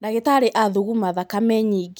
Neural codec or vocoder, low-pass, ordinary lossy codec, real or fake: none; none; none; real